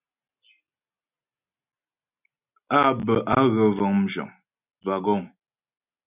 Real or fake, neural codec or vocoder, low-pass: real; none; 3.6 kHz